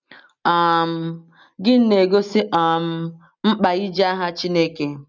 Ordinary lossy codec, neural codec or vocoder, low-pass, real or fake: none; none; 7.2 kHz; real